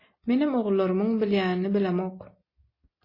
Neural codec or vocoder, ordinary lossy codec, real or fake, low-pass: none; MP3, 24 kbps; real; 5.4 kHz